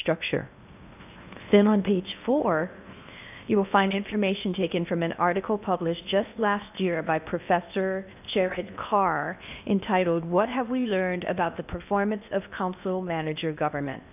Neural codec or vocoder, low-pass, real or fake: codec, 16 kHz in and 24 kHz out, 0.8 kbps, FocalCodec, streaming, 65536 codes; 3.6 kHz; fake